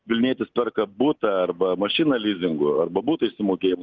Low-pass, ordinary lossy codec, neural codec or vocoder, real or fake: 7.2 kHz; Opus, 24 kbps; none; real